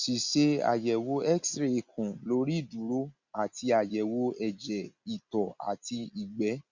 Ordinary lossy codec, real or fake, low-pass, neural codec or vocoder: Opus, 64 kbps; real; 7.2 kHz; none